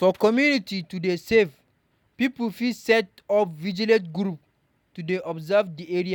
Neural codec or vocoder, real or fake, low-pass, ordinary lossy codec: none; real; 19.8 kHz; none